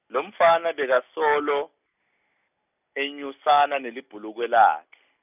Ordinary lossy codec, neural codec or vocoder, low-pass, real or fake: none; none; 3.6 kHz; real